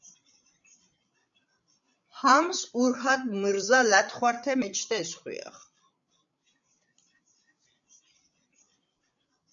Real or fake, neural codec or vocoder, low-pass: fake; codec, 16 kHz, 8 kbps, FreqCodec, larger model; 7.2 kHz